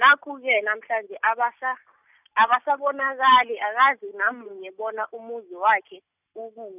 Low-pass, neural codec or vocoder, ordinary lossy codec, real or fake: 3.6 kHz; none; none; real